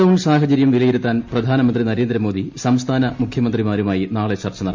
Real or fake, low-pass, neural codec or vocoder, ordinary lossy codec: real; 7.2 kHz; none; MP3, 48 kbps